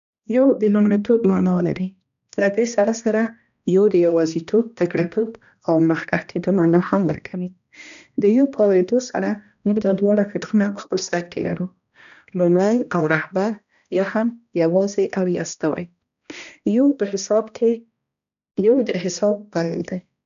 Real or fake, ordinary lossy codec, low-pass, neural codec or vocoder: fake; none; 7.2 kHz; codec, 16 kHz, 1 kbps, X-Codec, HuBERT features, trained on balanced general audio